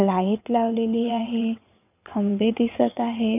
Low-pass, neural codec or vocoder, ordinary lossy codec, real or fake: 3.6 kHz; vocoder, 22.05 kHz, 80 mel bands, WaveNeXt; none; fake